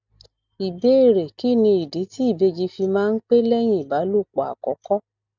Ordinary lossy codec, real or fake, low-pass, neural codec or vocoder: none; real; 7.2 kHz; none